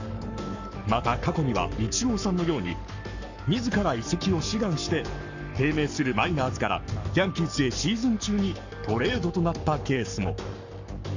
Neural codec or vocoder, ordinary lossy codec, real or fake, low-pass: codec, 44.1 kHz, 7.8 kbps, Pupu-Codec; none; fake; 7.2 kHz